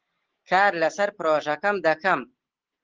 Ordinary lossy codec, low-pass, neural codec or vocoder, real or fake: Opus, 16 kbps; 7.2 kHz; none; real